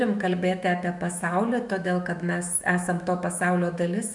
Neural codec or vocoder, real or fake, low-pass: autoencoder, 48 kHz, 128 numbers a frame, DAC-VAE, trained on Japanese speech; fake; 10.8 kHz